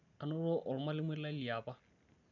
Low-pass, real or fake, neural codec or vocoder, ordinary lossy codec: 7.2 kHz; real; none; none